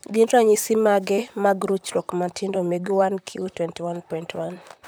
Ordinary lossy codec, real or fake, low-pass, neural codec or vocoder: none; fake; none; vocoder, 44.1 kHz, 128 mel bands, Pupu-Vocoder